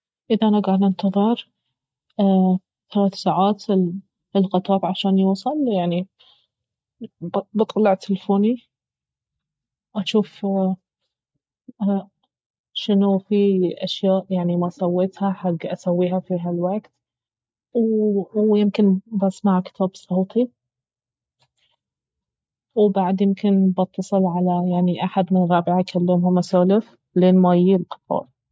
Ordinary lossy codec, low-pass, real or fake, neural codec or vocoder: none; none; real; none